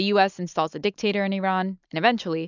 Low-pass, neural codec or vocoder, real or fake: 7.2 kHz; none; real